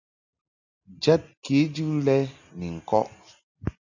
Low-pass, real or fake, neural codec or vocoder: 7.2 kHz; real; none